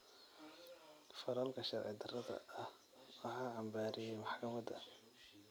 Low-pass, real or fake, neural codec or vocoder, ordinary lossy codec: none; fake; vocoder, 44.1 kHz, 128 mel bands every 256 samples, BigVGAN v2; none